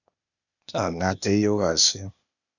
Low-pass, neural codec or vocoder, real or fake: 7.2 kHz; codec, 16 kHz, 0.8 kbps, ZipCodec; fake